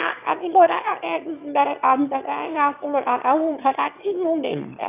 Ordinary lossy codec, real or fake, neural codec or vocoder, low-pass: none; fake; autoencoder, 22.05 kHz, a latent of 192 numbers a frame, VITS, trained on one speaker; 3.6 kHz